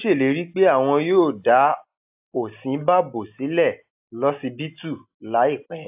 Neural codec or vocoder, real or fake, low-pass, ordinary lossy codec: none; real; 3.6 kHz; AAC, 32 kbps